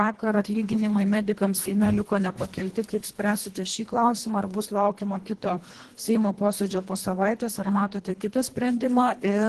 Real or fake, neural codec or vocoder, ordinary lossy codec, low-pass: fake; codec, 24 kHz, 1.5 kbps, HILCodec; Opus, 16 kbps; 10.8 kHz